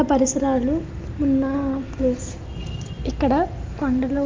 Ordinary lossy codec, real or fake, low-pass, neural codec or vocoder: Opus, 32 kbps; real; 7.2 kHz; none